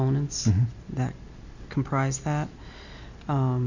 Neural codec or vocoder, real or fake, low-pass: none; real; 7.2 kHz